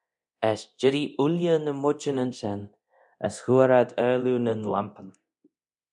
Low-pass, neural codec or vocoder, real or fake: 10.8 kHz; codec, 24 kHz, 0.9 kbps, DualCodec; fake